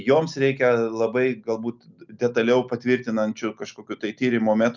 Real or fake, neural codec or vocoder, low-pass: real; none; 7.2 kHz